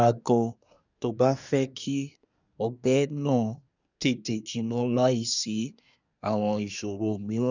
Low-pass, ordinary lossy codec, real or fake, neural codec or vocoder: 7.2 kHz; none; fake; codec, 24 kHz, 1 kbps, SNAC